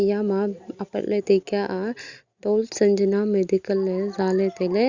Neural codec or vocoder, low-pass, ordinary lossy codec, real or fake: none; 7.2 kHz; Opus, 64 kbps; real